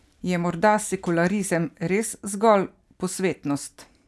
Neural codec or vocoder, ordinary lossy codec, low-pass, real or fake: none; none; none; real